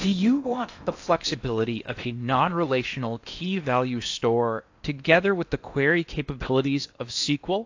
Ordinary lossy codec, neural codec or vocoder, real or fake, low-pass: AAC, 48 kbps; codec, 16 kHz in and 24 kHz out, 0.8 kbps, FocalCodec, streaming, 65536 codes; fake; 7.2 kHz